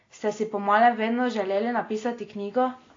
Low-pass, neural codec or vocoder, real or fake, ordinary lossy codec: 7.2 kHz; none; real; AAC, 32 kbps